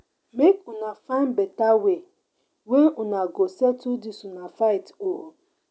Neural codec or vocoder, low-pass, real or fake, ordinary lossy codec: none; none; real; none